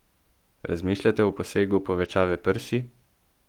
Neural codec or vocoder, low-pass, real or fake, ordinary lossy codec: codec, 44.1 kHz, 7.8 kbps, Pupu-Codec; 19.8 kHz; fake; Opus, 24 kbps